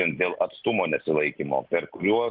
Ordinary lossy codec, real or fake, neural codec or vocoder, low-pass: Opus, 32 kbps; real; none; 5.4 kHz